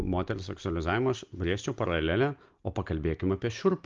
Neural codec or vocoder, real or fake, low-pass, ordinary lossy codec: none; real; 7.2 kHz; Opus, 32 kbps